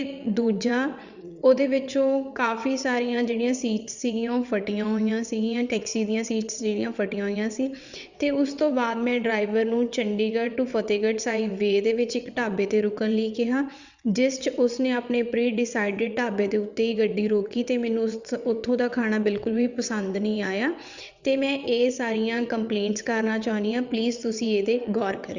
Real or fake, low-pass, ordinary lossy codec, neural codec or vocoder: fake; 7.2 kHz; Opus, 64 kbps; vocoder, 22.05 kHz, 80 mel bands, WaveNeXt